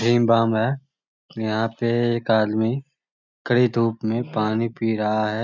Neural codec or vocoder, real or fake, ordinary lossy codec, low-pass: none; real; none; 7.2 kHz